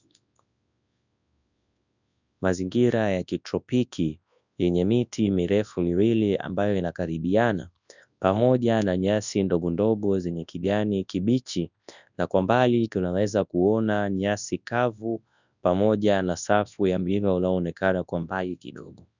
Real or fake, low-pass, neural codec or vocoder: fake; 7.2 kHz; codec, 24 kHz, 0.9 kbps, WavTokenizer, large speech release